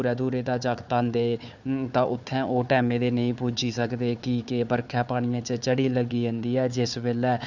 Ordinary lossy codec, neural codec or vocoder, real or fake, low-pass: none; codec, 16 kHz, 4 kbps, FunCodec, trained on Chinese and English, 50 frames a second; fake; 7.2 kHz